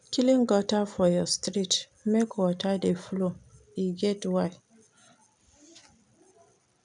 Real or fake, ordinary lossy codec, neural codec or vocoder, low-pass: real; none; none; 9.9 kHz